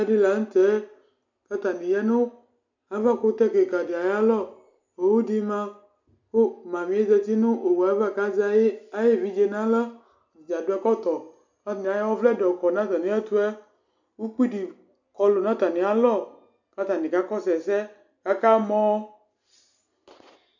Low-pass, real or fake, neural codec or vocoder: 7.2 kHz; real; none